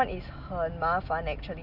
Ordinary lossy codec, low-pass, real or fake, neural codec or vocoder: none; 5.4 kHz; real; none